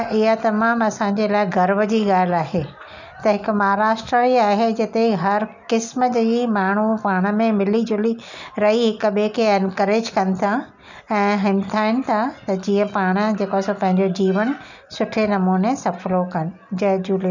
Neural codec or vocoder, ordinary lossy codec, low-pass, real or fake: none; none; 7.2 kHz; real